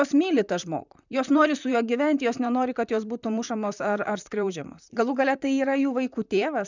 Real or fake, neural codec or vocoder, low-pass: fake; vocoder, 44.1 kHz, 128 mel bands, Pupu-Vocoder; 7.2 kHz